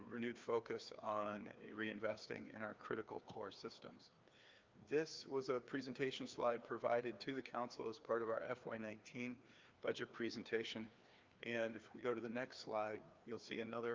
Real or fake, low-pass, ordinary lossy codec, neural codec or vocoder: fake; 7.2 kHz; Opus, 24 kbps; codec, 16 kHz, 2 kbps, FreqCodec, larger model